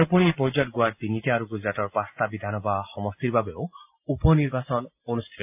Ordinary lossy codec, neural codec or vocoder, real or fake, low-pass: AAC, 32 kbps; none; real; 3.6 kHz